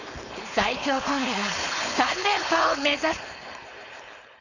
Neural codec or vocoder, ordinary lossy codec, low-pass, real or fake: codec, 16 kHz, 4.8 kbps, FACodec; none; 7.2 kHz; fake